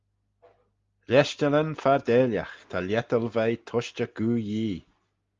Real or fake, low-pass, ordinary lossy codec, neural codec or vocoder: real; 7.2 kHz; Opus, 32 kbps; none